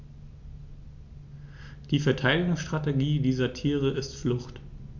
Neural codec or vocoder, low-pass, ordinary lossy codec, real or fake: none; 7.2 kHz; MP3, 64 kbps; real